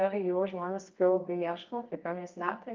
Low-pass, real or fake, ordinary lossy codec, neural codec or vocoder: 7.2 kHz; fake; Opus, 32 kbps; codec, 24 kHz, 0.9 kbps, WavTokenizer, medium music audio release